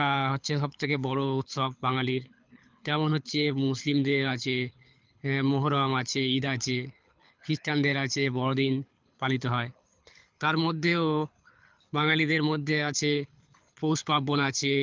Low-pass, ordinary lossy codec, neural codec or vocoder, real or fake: 7.2 kHz; Opus, 24 kbps; codec, 24 kHz, 6 kbps, HILCodec; fake